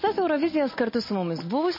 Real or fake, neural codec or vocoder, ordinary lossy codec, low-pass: real; none; MP3, 24 kbps; 5.4 kHz